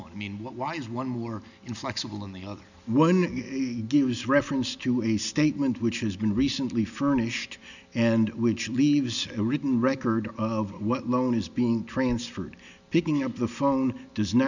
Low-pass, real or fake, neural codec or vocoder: 7.2 kHz; real; none